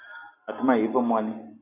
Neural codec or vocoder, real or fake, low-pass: none; real; 3.6 kHz